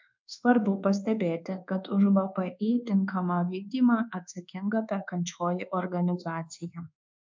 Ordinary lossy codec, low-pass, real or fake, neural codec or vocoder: MP3, 64 kbps; 7.2 kHz; fake; codec, 24 kHz, 1.2 kbps, DualCodec